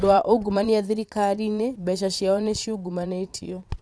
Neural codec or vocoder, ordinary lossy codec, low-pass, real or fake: vocoder, 22.05 kHz, 80 mel bands, Vocos; none; none; fake